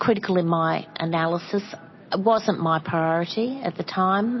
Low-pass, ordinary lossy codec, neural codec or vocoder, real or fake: 7.2 kHz; MP3, 24 kbps; none; real